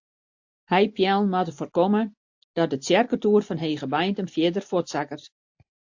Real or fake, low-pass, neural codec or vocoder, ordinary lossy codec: real; 7.2 kHz; none; AAC, 48 kbps